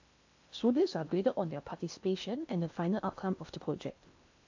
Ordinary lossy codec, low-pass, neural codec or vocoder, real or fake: AAC, 48 kbps; 7.2 kHz; codec, 16 kHz in and 24 kHz out, 0.8 kbps, FocalCodec, streaming, 65536 codes; fake